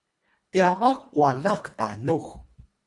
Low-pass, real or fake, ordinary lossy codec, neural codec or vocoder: 10.8 kHz; fake; Opus, 64 kbps; codec, 24 kHz, 1.5 kbps, HILCodec